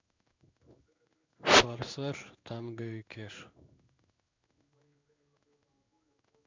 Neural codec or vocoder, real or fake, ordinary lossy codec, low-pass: codec, 16 kHz in and 24 kHz out, 1 kbps, XY-Tokenizer; fake; MP3, 64 kbps; 7.2 kHz